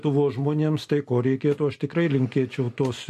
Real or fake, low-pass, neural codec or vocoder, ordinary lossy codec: real; 14.4 kHz; none; MP3, 64 kbps